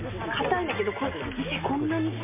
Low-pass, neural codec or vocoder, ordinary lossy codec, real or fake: 3.6 kHz; none; MP3, 32 kbps; real